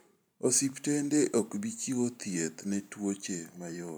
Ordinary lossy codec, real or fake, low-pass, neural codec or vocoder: none; fake; none; vocoder, 44.1 kHz, 128 mel bands every 512 samples, BigVGAN v2